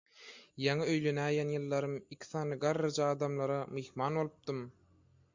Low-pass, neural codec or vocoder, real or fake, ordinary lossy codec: 7.2 kHz; none; real; MP3, 64 kbps